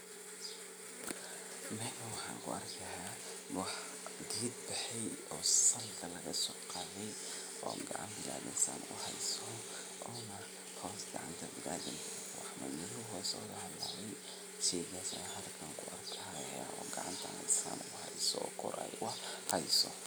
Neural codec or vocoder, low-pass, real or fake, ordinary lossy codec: none; none; real; none